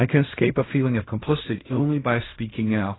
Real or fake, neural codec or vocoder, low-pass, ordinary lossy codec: fake; codec, 16 kHz in and 24 kHz out, 0.4 kbps, LongCat-Audio-Codec, fine tuned four codebook decoder; 7.2 kHz; AAC, 16 kbps